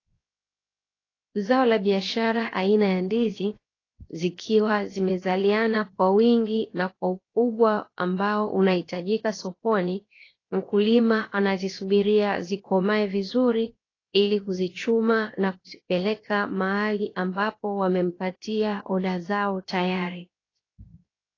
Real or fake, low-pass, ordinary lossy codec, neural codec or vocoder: fake; 7.2 kHz; AAC, 32 kbps; codec, 16 kHz, 0.7 kbps, FocalCodec